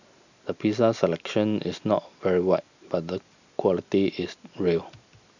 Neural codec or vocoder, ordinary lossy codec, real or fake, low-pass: none; none; real; 7.2 kHz